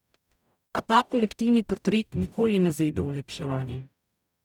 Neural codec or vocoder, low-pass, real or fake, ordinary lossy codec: codec, 44.1 kHz, 0.9 kbps, DAC; 19.8 kHz; fake; none